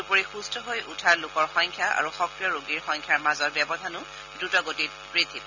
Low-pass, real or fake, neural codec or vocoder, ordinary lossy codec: 7.2 kHz; real; none; none